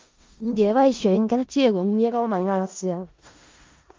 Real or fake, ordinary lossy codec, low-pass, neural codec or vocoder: fake; Opus, 24 kbps; 7.2 kHz; codec, 16 kHz in and 24 kHz out, 0.4 kbps, LongCat-Audio-Codec, four codebook decoder